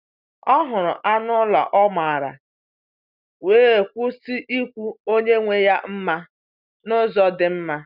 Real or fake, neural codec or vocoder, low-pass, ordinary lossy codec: real; none; 5.4 kHz; none